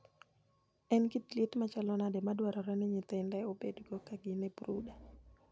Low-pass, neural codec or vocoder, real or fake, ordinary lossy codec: none; none; real; none